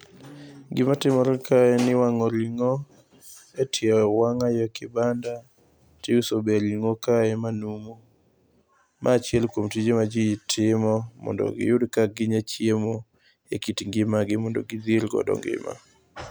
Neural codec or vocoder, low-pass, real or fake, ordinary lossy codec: none; none; real; none